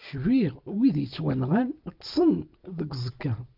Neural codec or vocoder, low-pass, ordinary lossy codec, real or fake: none; 5.4 kHz; Opus, 24 kbps; real